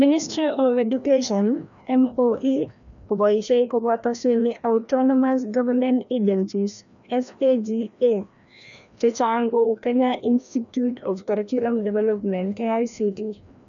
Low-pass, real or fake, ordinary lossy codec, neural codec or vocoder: 7.2 kHz; fake; MP3, 96 kbps; codec, 16 kHz, 1 kbps, FreqCodec, larger model